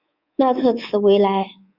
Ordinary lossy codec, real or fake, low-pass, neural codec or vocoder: Opus, 64 kbps; fake; 5.4 kHz; codec, 24 kHz, 3.1 kbps, DualCodec